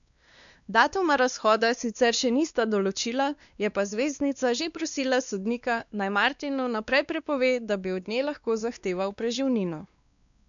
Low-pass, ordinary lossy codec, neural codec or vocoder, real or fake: 7.2 kHz; none; codec, 16 kHz, 2 kbps, X-Codec, WavLM features, trained on Multilingual LibriSpeech; fake